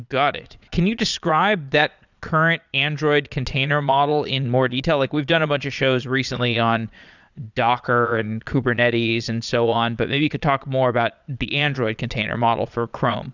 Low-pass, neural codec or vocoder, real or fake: 7.2 kHz; vocoder, 22.05 kHz, 80 mel bands, Vocos; fake